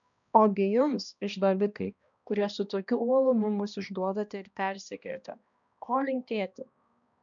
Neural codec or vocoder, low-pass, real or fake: codec, 16 kHz, 1 kbps, X-Codec, HuBERT features, trained on balanced general audio; 7.2 kHz; fake